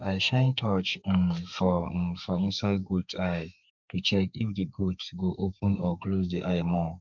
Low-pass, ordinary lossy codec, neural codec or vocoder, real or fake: 7.2 kHz; MP3, 64 kbps; codec, 32 kHz, 1.9 kbps, SNAC; fake